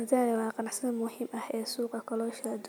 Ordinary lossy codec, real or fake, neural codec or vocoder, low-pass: none; real; none; none